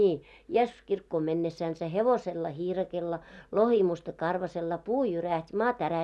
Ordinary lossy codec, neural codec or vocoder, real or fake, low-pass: none; none; real; none